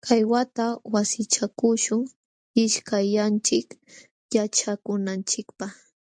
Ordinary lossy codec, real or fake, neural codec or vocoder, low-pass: MP3, 64 kbps; real; none; 9.9 kHz